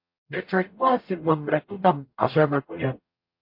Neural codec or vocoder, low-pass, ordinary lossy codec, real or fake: codec, 44.1 kHz, 0.9 kbps, DAC; 5.4 kHz; MP3, 32 kbps; fake